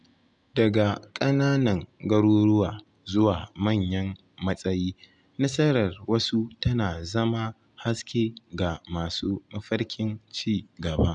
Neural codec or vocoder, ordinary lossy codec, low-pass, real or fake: none; none; 9.9 kHz; real